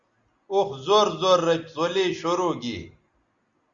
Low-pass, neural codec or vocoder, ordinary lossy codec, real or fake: 7.2 kHz; none; Opus, 64 kbps; real